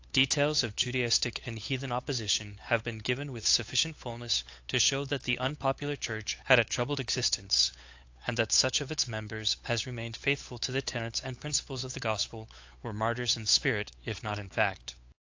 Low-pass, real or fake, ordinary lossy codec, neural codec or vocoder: 7.2 kHz; fake; AAC, 48 kbps; codec, 16 kHz, 8 kbps, FunCodec, trained on Chinese and English, 25 frames a second